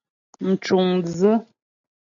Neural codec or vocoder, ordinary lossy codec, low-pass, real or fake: none; AAC, 64 kbps; 7.2 kHz; real